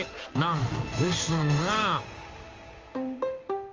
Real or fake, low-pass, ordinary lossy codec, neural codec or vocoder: fake; 7.2 kHz; Opus, 32 kbps; codec, 16 kHz in and 24 kHz out, 1 kbps, XY-Tokenizer